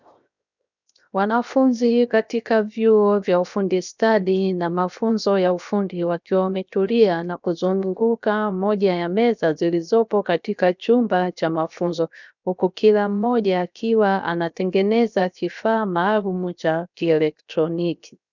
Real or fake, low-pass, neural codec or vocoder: fake; 7.2 kHz; codec, 16 kHz, 0.7 kbps, FocalCodec